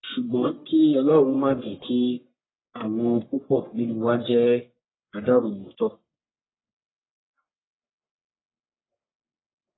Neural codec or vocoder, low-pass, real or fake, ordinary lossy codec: codec, 44.1 kHz, 1.7 kbps, Pupu-Codec; 7.2 kHz; fake; AAC, 16 kbps